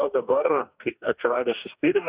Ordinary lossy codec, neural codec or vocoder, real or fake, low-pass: Opus, 64 kbps; codec, 44.1 kHz, 2.6 kbps, DAC; fake; 3.6 kHz